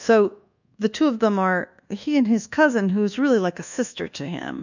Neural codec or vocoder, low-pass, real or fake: codec, 24 kHz, 1.2 kbps, DualCodec; 7.2 kHz; fake